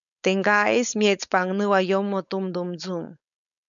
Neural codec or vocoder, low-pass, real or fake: codec, 16 kHz, 4.8 kbps, FACodec; 7.2 kHz; fake